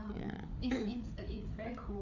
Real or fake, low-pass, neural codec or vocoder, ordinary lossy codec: fake; 7.2 kHz; codec, 16 kHz, 4 kbps, FreqCodec, larger model; none